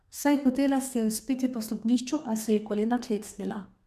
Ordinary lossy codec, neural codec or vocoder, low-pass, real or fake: MP3, 96 kbps; codec, 32 kHz, 1.9 kbps, SNAC; 14.4 kHz; fake